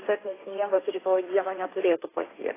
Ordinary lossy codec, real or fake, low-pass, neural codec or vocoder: AAC, 16 kbps; fake; 3.6 kHz; codec, 16 kHz, 1.1 kbps, Voila-Tokenizer